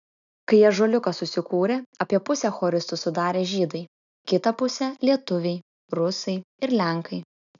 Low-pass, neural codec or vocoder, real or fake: 7.2 kHz; none; real